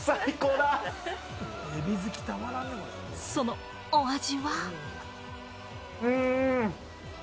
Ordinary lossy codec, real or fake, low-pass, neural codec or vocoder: none; real; none; none